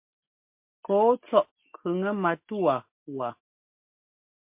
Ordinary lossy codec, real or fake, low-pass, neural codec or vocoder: MP3, 32 kbps; real; 3.6 kHz; none